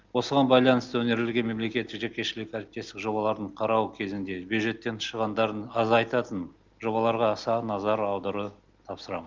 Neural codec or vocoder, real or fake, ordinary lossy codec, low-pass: none; real; Opus, 32 kbps; 7.2 kHz